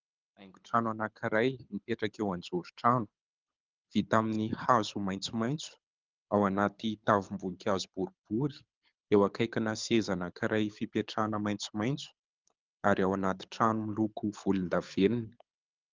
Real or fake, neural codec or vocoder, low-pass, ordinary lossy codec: fake; codec, 24 kHz, 6 kbps, HILCodec; 7.2 kHz; Opus, 24 kbps